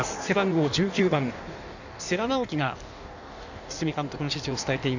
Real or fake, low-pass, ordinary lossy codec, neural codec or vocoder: fake; 7.2 kHz; none; codec, 16 kHz in and 24 kHz out, 1.1 kbps, FireRedTTS-2 codec